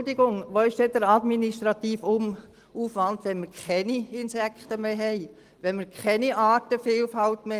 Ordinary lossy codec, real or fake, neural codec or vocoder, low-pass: Opus, 24 kbps; real; none; 14.4 kHz